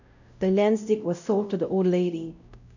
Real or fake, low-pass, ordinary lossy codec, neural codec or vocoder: fake; 7.2 kHz; none; codec, 16 kHz, 0.5 kbps, X-Codec, WavLM features, trained on Multilingual LibriSpeech